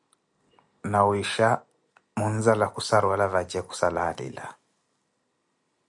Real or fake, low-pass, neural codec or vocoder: real; 10.8 kHz; none